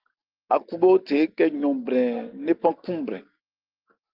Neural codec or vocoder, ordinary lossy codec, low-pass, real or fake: none; Opus, 32 kbps; 5.4 kHz; real